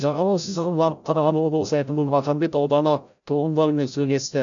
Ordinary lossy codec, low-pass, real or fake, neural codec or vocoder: none; 7.2 kHz; fake; codec, 16 kHz, 0.5 kbps, FreqCodec, larger model